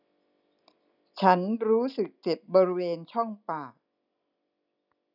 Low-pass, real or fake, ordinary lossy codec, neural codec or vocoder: 5.4 kHz; real; none; none